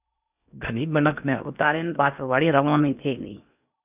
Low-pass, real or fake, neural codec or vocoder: 3.6 kHz; fake; codec, 16 kHz in and 24 kHz out, 0.8 kbps, FocalCodec, streaming, 65536 codes